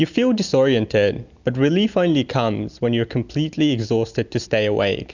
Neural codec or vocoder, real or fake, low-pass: none; real; 7.2 kHz